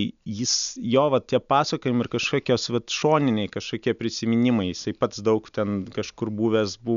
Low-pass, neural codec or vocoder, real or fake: 7.2 kHz; none; real